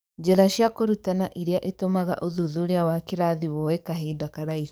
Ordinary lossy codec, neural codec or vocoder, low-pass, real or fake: none; codec, 44.1 kHz, 7.8 kbps, DAC; none; fake